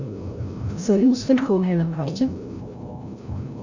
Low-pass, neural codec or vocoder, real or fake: 7.2 kHz; codec, 16 kHz, 0.5 kbps, FreqCodec, larger model; fake